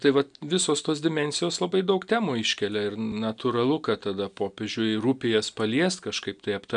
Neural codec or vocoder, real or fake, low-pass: none; real; 9.9 kHz